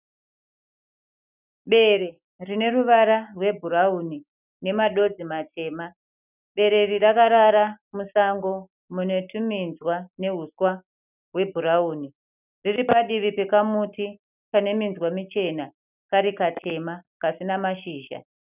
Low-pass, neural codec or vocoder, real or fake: 3.6 kHz; none; real